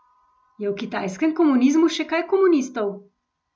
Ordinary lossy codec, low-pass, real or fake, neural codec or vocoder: none; none; real; none